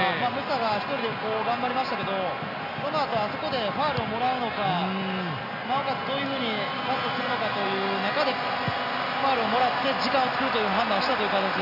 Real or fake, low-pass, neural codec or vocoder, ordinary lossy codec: real; 5.4 kHz; none; none